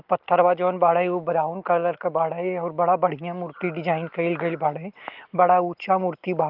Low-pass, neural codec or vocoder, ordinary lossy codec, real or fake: 5.4 kHz; none; Opus, 24 kbps; real